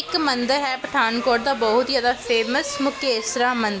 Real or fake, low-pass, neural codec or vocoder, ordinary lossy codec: real; none; none; none